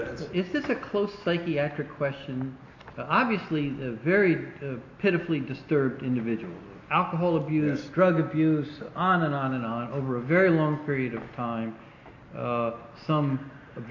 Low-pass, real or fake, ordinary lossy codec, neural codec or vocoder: 7.2 kHz; real; AAC, 48 kbps; none